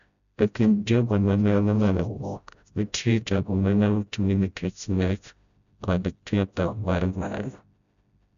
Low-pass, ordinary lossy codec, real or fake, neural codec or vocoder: 7.2 kHz; none; fake; codec, 16 kHz, 0.5 kbps, FreqCodec, smaller model